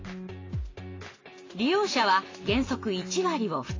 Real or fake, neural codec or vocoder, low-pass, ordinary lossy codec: real; none; 7.2 kHz; AAC, 32 kbps